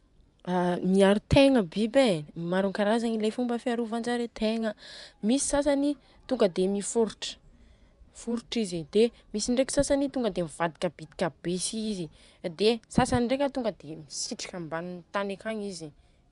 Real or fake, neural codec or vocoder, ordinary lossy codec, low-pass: fake; vocoder, 24 kHz, 100 mel bands, Vocos; none; 10.8 kHz